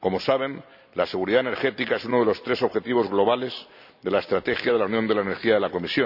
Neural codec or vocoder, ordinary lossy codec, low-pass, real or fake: none; none; 5.4 kHz; real